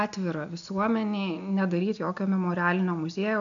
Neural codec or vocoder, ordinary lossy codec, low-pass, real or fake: none; AAC, 64 kbps; 7.2 kHz; real